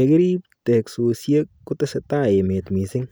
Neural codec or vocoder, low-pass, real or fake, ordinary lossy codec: none; none; real; none